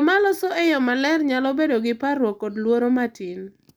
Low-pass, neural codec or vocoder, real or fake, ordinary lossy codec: none; none; real; none